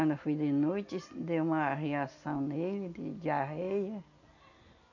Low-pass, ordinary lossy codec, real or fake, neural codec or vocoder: 7.2 kHz; none; real; none